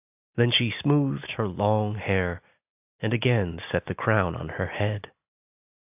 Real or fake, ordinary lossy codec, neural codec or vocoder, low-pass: real; AAC, 32 kbps; none; 3.6 kHz